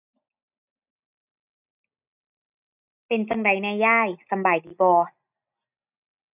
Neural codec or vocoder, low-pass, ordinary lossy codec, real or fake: none; 3.6 kHz; none; real